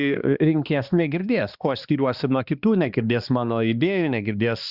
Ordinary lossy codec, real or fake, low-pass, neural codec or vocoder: Opus, 64 kbps; fake; 5.4 kHz; codec, 16 kHz, 2 kbps, X-Codec, HuBERT features, trained on balanced general audio